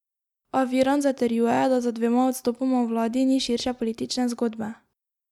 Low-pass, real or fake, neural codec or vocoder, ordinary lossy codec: 19.8 kHz; real; none; none